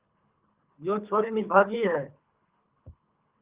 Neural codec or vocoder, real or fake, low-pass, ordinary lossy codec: codec, 24 kHz, 3 kbps, HILCodec; fake; 3.6 kHz; Opus, 32 kbps